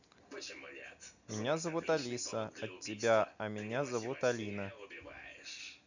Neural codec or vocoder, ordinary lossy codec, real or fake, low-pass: none; none; real; 7.2 kHz